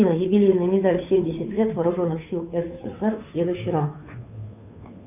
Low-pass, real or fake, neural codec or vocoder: 3.6 kHz; fake; codec, 16 kHz, 2 kbps, FunCodec, trained on Chinese and English, 25 frames a second